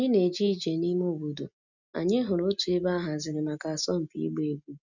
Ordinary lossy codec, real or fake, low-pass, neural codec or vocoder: none; real; 7.2 kHz; none